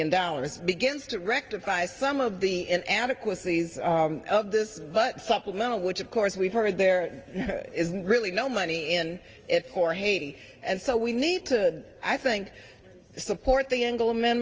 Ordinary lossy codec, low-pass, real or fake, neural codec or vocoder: Opus, 24 kbps; 7.2 kHz; real; none